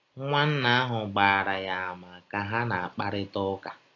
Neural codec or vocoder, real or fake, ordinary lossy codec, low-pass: none; real; AAC, 32 kbps; 7.2 kHz